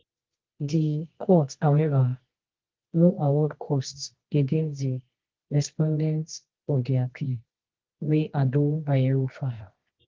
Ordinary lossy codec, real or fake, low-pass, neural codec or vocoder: Opus, 16 kbps; fake; 7.2 kHz; codec, 24 kHz, 0.9 kbps, WavTokenizer, medium music audio release